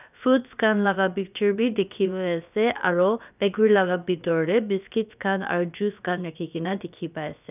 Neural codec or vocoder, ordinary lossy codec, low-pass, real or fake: codec, 16 kHz, about 1 kbps, DyCAST, with the encoder's durations; none; 3.6 kHz; fake